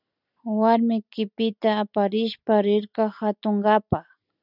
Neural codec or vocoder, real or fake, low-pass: none; real; 5.4 kHz